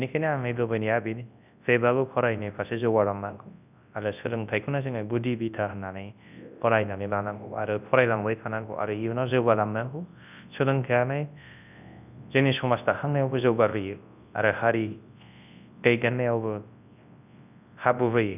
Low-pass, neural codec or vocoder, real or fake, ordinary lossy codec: 3.6 kHz; codec, 24 kHz, 0.9 kbps, WavTokenizer, large speech release; fake; none